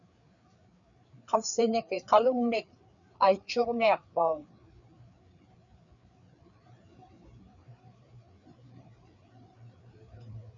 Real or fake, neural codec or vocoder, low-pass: fake; codec, 16 kHz, 4 kbps, FreqCodec, larger model; 7.2 kHz